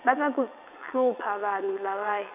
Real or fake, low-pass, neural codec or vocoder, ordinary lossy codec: fake; 3.6 kHz; vocoder, 22.05 kHz, 80 mel bands, WaveNeXt; none